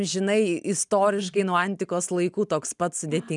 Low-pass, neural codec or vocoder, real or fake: 10.8 kHz; none; real